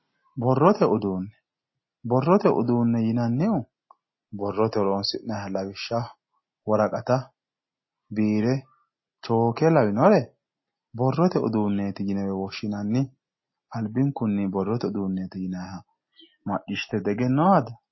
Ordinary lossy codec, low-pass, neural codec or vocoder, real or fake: MP3, 24 kbps; 7.2 kHz; none; real